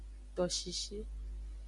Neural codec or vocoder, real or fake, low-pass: none; real; 10.8 kHz